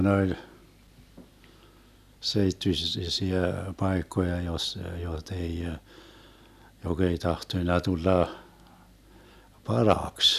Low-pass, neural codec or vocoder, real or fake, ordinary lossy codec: 14.4 kHz; none; real; none